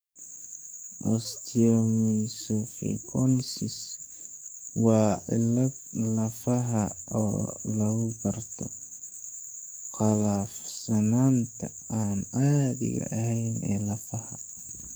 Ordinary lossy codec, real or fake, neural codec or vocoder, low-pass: none; fake; codec, 44.1 kHz, 7.8 kbps, DAC; none